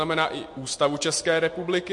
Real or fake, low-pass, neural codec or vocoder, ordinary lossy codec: real; 10.8 kHz; none; MP3, 48 kbps